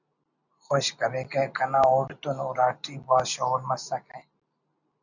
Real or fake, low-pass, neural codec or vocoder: real; 7.2 kHz; none